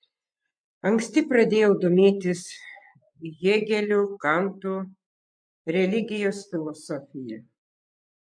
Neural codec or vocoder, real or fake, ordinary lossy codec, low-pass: vocoder, 24 kHz, 100 mel bands, Vocos; fake; MP3, 64 kbps; 9.9 kHz